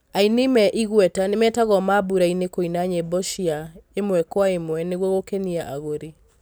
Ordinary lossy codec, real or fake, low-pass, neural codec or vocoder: none; real; none; none